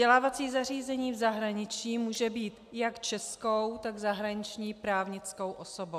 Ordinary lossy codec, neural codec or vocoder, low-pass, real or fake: AAC, 96 kbps; none; 14.4 kHz; real